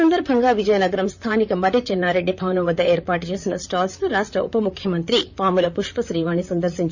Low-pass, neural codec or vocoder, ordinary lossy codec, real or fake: 7.2 kHz; vocoder, 44.1 kHz, 128 mel bands, Pupu-Vocoder; Opus, 64 kbps; fake